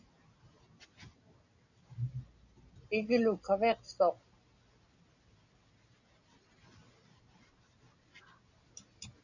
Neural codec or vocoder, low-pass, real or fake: none; 7.2 kHz; real